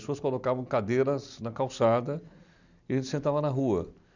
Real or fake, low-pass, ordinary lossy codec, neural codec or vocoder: real; 7.2 kHz; none; none